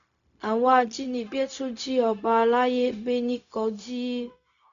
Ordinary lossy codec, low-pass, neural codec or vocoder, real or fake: none; 7.2 kHz; codec, 16 kHz, 0.4 kbps, LongCat-Audio-Codec; fake